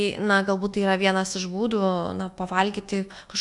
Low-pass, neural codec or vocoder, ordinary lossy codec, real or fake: 10.8 kHz; codec, 24 kHz, 1.2 kbps, DualCodec; AAC, 64 kbps; fake